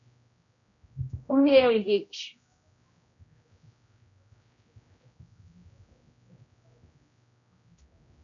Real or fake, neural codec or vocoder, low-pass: fake; codec, 16 kHz, 0.5 kbps, X-Codec, HuBERT features, trained on general audio; 7.2 kHz